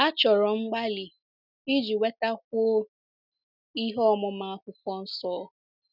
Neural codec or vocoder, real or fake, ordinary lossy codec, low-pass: none; real; none; 5.4 kHz